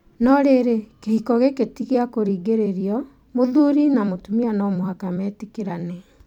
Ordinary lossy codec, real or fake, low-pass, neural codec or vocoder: none; fake; 19.8 kHz; vocoder, 44.1 kHz, 128 mel bands every 256 samples, BigVGAN v2